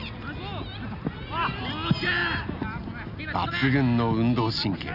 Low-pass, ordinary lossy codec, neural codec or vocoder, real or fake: 5.4 kHz; none; none; real